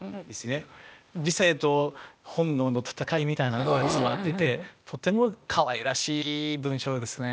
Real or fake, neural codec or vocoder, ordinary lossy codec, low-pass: fake; codec, 16 kHz, 0.8 kbps, ZipCodec; none; none